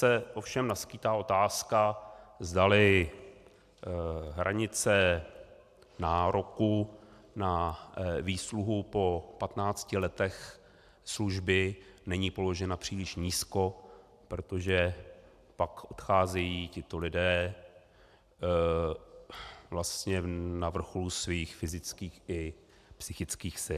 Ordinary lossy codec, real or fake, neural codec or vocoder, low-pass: AAC, 96 kbps; real; none; 14.4 kHz